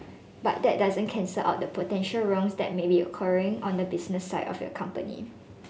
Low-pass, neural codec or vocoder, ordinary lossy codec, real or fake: none; none; none; real